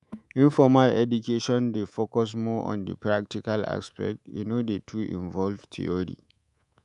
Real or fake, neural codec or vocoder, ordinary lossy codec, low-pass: fake; codec, 24 kHz, 3.1 kbps, DualCodec; none; 10.8 kHz